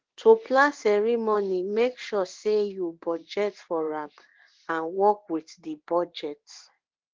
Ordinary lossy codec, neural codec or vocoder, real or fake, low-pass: Opus, 16 kbps; codec, 16 kHz in and 24 kHz out, 1 kbps, XY-Tokenizer; fake; 7.2 kHz